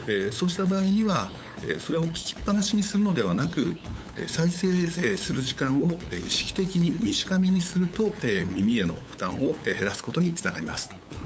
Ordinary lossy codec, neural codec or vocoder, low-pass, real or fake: none; codec, 16 kHz, 8 kbps, FunCodec, trained on LibriTTS, 25 frames a second; none; fake